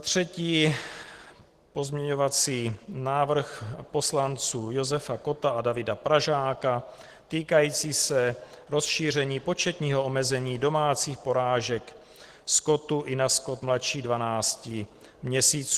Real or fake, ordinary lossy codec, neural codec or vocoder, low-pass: real; Opus, 16 kbps; none; 14.4 kHz